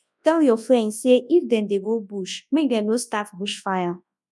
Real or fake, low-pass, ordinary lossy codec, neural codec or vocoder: fake; none; none; codec, 24 kHz, 0.9 kbps, WavTokenizer, large speech release